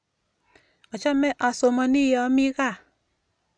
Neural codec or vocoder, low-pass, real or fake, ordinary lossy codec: none; 9.9 kHz; real; none